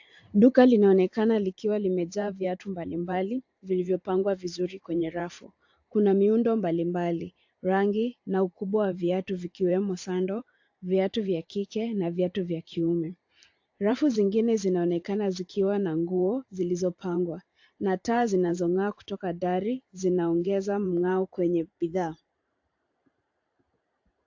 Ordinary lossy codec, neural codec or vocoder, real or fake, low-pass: AAC, 48 kbps; vocoder, 44.1 kHz, 128 mel bands every 512 samples, BigVGAN v2; fake; 7.2 kHz